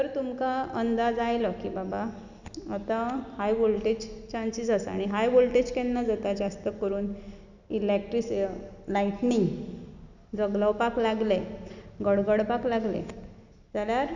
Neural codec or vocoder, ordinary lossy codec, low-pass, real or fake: none; none; 7.2 kHz; real